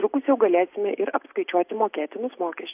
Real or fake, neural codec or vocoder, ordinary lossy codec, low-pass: real; none; AAC, 32 kbps; 3.6 kHz